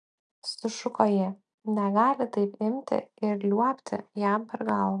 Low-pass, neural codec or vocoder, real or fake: 9.9 kHz; none; real